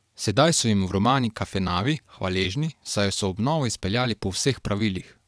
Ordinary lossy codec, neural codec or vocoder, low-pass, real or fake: none; vocoder, 22.05 kHz, 80 mel bands, WaveNeXt; none; fake